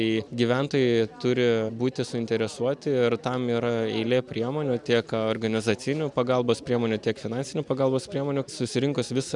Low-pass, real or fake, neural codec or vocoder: 10.8 kHz; real; none